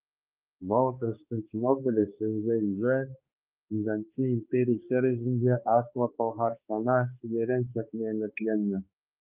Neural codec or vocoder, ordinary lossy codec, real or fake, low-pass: codec, 16 kHz, 2 kbps, X-Codec, HuBERT features, trained on balanced general audio; Opus, 24 kbps; fake; 3.6 kHz